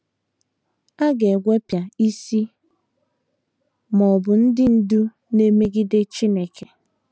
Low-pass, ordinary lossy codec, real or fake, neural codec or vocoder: none; none; real; none